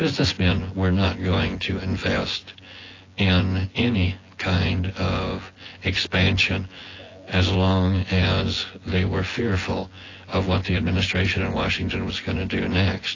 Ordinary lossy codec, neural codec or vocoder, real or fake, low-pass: AAC, 32 kbps; vocoder, 24 kHz, 100 mel bands, Vocos; fake; 7.2 kHz